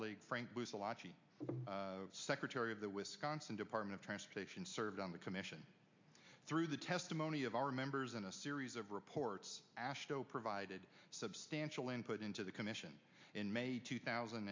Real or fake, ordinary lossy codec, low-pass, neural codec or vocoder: real; MP3, 64 kbps; 7.2 kHz; none